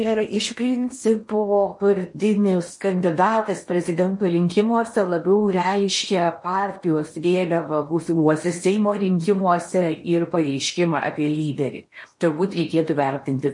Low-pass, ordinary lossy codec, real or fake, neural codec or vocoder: 10.8 kHz; MP3, 48 kbps; fake; codec, 16 kHz in and 24 kHz out, 0.8 kbps, FocalCodec, streaming, 65536 codes